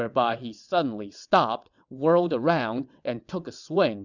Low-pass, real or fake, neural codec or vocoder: 7.2 kHz; fake; vocoder, 22.05 kHz, 80 mel bands, WaveNeXt